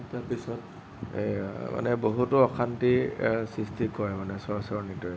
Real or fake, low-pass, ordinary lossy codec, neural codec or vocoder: real; none; none; none